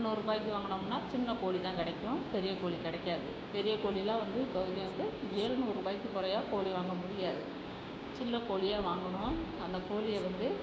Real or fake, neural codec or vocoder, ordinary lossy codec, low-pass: fake; codec, 16 kHz, 6 kbps, DAC; none; none